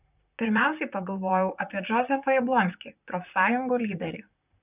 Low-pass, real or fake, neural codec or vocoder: 3.6 kHz; fake; vocoder, 44.1 kHz, 128 mel bands, Pupu-Vocoder